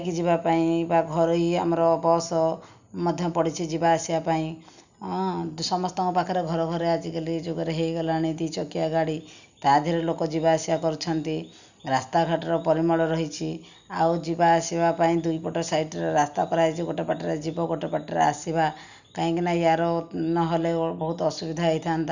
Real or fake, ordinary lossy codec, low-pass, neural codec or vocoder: real; none; 7.2 kHz; none